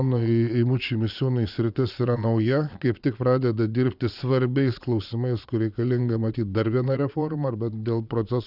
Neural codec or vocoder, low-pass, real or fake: vocoder, 22.05 kHz, 80 mel bands, Vocos; 5.4 kHz; fake